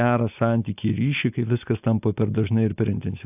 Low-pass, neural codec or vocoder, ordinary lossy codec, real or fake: 3.6 kHz; none; AAC, 32 kbps; real